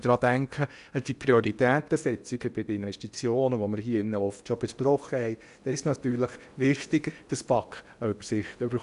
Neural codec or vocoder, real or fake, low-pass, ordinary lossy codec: codec, 16 kHz in and 24 kHz out, 0.8 kbps, FocalCodec, streaming, 65536 codes; fake; 10.8 kHz; none